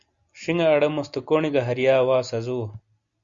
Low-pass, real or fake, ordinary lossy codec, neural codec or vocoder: 7.2 kHz; real; Opus, 64 kbps; none